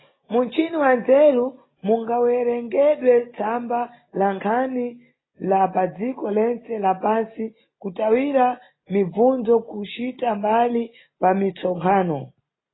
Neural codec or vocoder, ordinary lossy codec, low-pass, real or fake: none; AAC, 16 kbps; 7.2 kHz; real